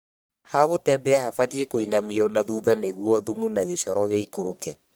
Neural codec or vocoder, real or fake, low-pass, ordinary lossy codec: codec, 44.1 kHz, 1.7 kbps, Pupu-Codec; fake; none; none